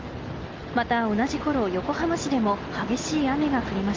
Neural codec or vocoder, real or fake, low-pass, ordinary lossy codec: none; real; 7.2 kHz; Opus, 32 kbps